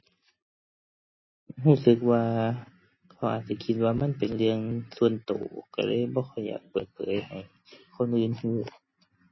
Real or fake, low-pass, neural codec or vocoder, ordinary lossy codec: real; 7.2 kHz; none; MP3, 24 kbps